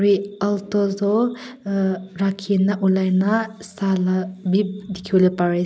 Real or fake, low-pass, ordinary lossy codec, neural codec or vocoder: real; none; none; none